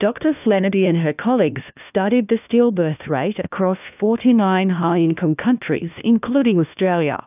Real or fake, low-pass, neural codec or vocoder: fake; 3.6 kHz; codec, 16 kHz, 1 kbps, FunCodec, trained on LibriTTS, 50 frames a second